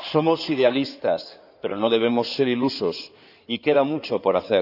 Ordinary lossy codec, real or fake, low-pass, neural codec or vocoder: none; fake; 5.4 kHz; codec, 16 kHz in and 24 kHz out, 2.2 kbps, FireRedTTS-2 codec